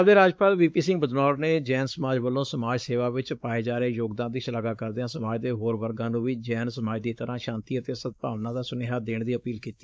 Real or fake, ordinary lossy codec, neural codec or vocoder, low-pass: fake; none; codec, 16 kHz, 4 kbps, X-Codec, WavLM features, trained on Multilingual LibriSpeech; none